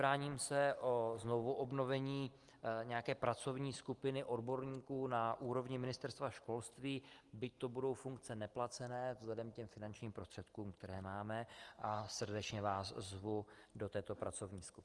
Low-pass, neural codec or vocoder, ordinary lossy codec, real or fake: 10.8 kHz; none; Opus, 24 kbps; real